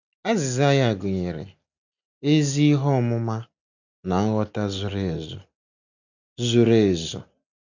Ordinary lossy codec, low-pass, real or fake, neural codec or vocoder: none; 7.2 kHz; real; none